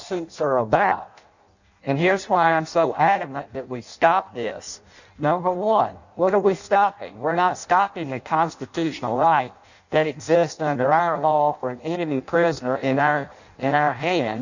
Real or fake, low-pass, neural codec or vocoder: fake; 7.2 kHz; codec, 16 kHz in and 24 kHz out, 0.6 kbps, FireRedTTS-2 codec